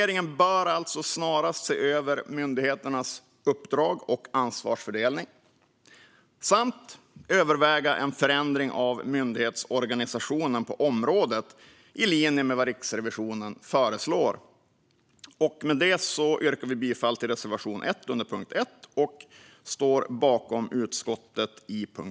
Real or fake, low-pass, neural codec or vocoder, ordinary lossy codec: real; none; none; none